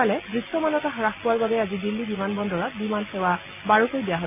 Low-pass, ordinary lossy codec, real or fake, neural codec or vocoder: 3.6 kHz; none; real; none